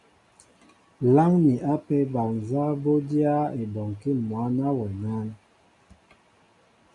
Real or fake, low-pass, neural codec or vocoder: real; 10.8 kHz; none